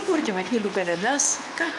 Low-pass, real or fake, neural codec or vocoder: 10.8 kHz; fake; codec, 24 kHz, 0.9 kbps, WavTokenizer, medium speech release version 2